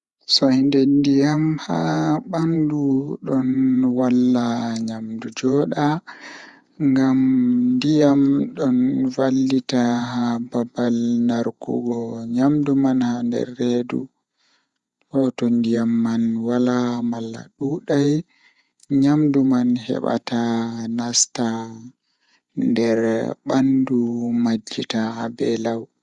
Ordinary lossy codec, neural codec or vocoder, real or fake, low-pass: Opus, 64 kbps; vocoder, 48 kHz, 128 mel bands, Vocos; fake; 10.8 kHz